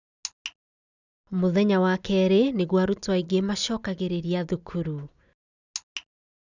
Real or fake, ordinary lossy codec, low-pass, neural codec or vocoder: real; none; 7.2 kHz; none